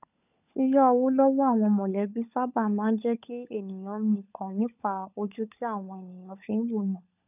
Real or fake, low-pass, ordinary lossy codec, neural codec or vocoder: fake; 3.6 kHz; none; codec, 16 kHz, 16 kbps, FunCodec, trained on LibriTTS, 50 frames a second